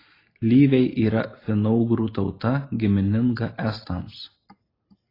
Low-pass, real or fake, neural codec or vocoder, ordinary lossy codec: 5.4 kHz; real; none; AAC, 24 kbps